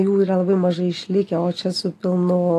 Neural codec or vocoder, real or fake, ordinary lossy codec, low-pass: none; real; AAC, 48 kbps; 14.4 kHz